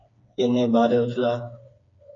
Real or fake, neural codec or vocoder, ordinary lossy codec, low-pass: fake; codec, 16 kHz, 4 kbps, FreqCodec, smaller model; AAC, 32 kbps; 7.2 kHz